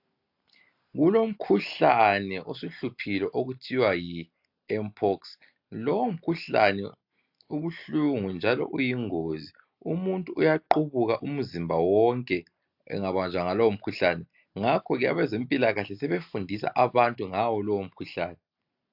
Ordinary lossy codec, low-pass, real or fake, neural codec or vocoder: AAC, 48 kbps; 5.4 kHz; real; none